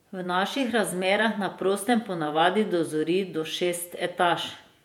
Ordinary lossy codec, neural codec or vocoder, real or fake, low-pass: MP3, 96 kbps; vocoder, 48 kHz, 128 mel bands, Vocos; fake; 19.8 kHz